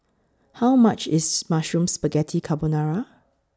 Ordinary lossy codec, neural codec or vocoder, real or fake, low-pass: none; none; real; none